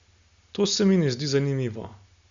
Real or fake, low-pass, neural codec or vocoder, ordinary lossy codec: real; 7.2 kHz; none; Opus, 64 kbps